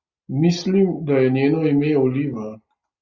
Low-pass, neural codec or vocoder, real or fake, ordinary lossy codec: 7.2 kHz; none; real; Opus, 64 kbps